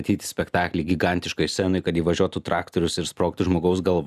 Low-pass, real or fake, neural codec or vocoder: 14.4 kHz; real; none